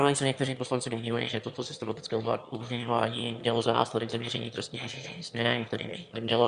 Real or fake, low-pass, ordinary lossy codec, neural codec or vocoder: fake; 9.9 kHz; Opus, 64 kbps; autoencoder, 22.05 kHz, a latent of 192 numbers a frame, VITS, trained on one speaker